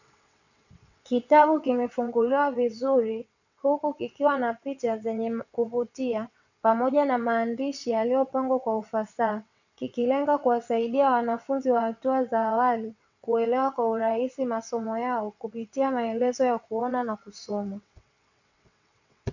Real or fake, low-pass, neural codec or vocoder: fake; 7.2 kHz; vocoder, 22.05 kHz, 80 mel bands, WaveNeXt